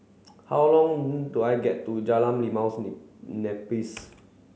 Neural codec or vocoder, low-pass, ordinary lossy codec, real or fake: none; none; none; real